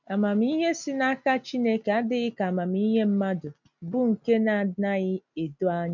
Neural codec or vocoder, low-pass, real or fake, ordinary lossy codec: none; 7.2 kHz; real; none